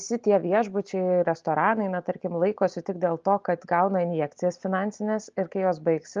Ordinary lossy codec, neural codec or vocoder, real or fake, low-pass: Opus, 32 kbps; none; real; 7.2 kHz